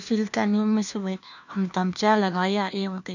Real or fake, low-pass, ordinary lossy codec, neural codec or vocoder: fake; 7.2 kHz; none; codec, 16 kHz, 1 kbps, FunCodec, trained on LibriTTS, 50 frames a second